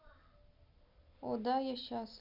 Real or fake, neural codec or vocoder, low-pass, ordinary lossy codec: real; none; 5.4 kHz; none